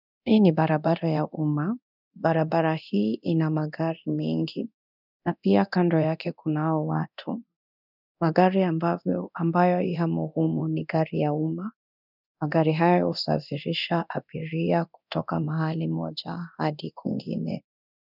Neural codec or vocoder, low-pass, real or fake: codec, 24 kHz, 0.9 kbps, DualCodec; 5.4 kHz; fake